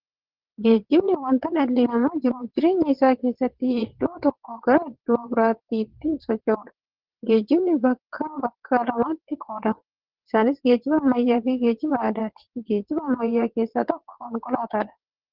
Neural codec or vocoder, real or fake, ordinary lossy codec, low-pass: vocoder, 22.05 kHz, 80 mel bands, WaveNeXt; fake; Opus, 16 kbps; 5.4 kHz